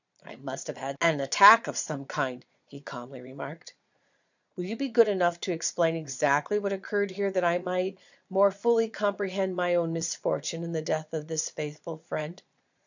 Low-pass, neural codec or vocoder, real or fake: 7.2 kHz; vocoder, 22.05 kHz, 80 mel bands, Vocos; fake